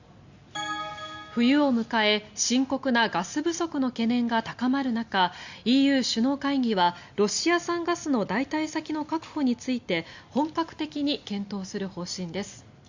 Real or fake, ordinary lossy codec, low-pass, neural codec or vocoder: real; Opus, 64 kbps; 7.2 kHz; none